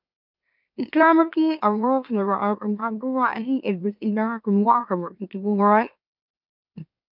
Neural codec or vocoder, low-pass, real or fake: autoencoder, 44.1 kHz, a latent of 192 numbers a frame, MeloTTS; 5.4 kHz; fake